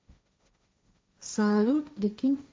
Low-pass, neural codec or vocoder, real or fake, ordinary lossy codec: none; codec, 16 kHz, 1.1 kbps, Voila-Tokenizer; fake; none